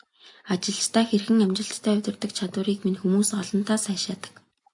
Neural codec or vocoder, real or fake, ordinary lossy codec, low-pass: none; real; MP3, 96 kbps; 10.8 kHz